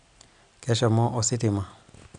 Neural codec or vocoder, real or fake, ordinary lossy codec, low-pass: none; real; none; 9.9 kHz